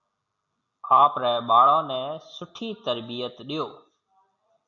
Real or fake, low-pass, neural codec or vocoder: real; 7.2 kHz; none